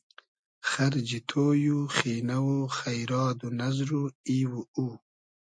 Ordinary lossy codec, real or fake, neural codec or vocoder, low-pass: MP3, 48 kbps; real; none; 9.9 kHz